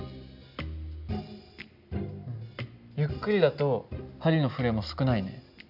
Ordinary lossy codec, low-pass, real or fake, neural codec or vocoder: none; 5.4 kHz; real; none